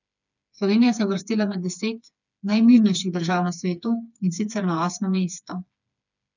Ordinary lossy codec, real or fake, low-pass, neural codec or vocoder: none; fake; 7.2 kHz; codec, 16 kHz, 4 kbps, FreqCodec, smaller model